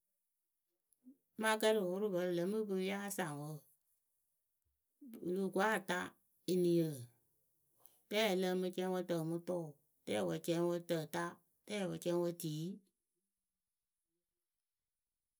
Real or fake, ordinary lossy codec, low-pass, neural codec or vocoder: real; none; none; none